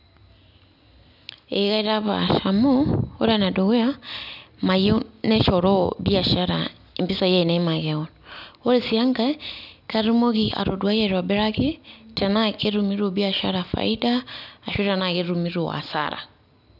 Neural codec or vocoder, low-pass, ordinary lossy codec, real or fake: none; 5.4 kHz; none; real